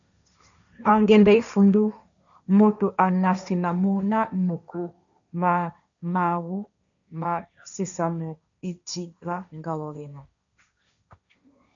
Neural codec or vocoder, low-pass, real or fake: codec, 16 kHz, 1.1 kbps, Voila-Tokenizer; 7.2 kHz; fake